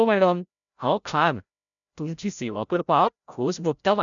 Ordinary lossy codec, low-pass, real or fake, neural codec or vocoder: none; 7.2 kHz; fake; codec, 16 kHz, 0.5 kbps, FreqCodec, larger model